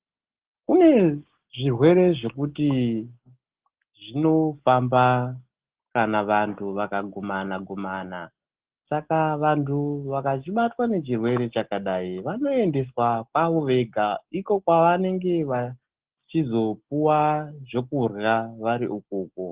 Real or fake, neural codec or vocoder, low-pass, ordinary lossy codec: real; none; 3.6 kHz; Opus, 16 kbps